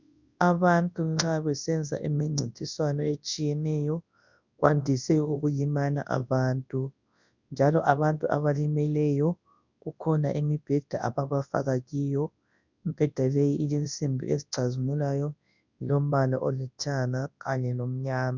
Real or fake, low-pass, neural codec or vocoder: fake; 7.2 kHz; codec, 24 kHz, 0.9 kbps, WavTokenizer, large speech release